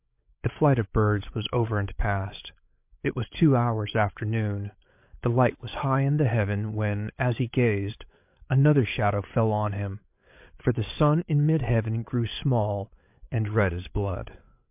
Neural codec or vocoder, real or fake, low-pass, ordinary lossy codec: codec, 16 kHz, 8 kbps, FreqCodec, larger model; fake; 3.6 kHz; MP3, 32 kbps